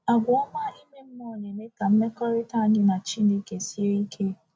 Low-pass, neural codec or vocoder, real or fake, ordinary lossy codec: none; none; real; none